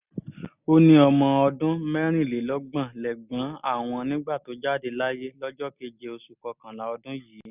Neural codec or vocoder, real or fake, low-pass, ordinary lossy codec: none; real; 3.6 kHz; none